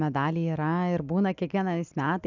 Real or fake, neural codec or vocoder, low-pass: real; none; 7.2 kHz